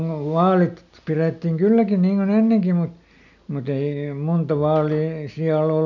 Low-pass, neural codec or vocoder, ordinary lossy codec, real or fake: 7.2 kHz; none; none; real